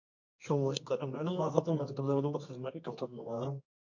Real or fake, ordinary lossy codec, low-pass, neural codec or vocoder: fake; MP3, 48 kbps; 7.2 kHz; codec, 24 kHz, 0.9 kbps, WavTokenizer, medium music audio release